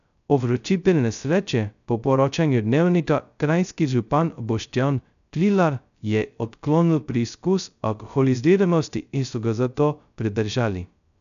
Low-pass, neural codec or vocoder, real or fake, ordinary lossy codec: 7.2 kHz; codec, 16 kHz, 0.2 kbps, FocalCodec; fake; none